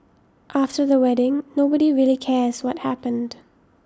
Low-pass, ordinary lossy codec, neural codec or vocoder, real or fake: none; none; none; real